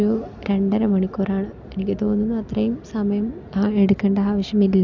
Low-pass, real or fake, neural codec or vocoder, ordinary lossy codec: 7.2 kHz; real; none; none